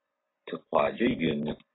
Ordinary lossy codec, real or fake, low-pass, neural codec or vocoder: AAC, 16 kbps; real; 7.2 kHz; none